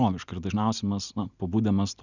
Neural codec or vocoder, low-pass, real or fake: none; 7.2 kHz; real